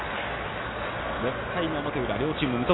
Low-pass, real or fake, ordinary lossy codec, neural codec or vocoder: 7.2 kHz; real; AAC, 16 kbps; none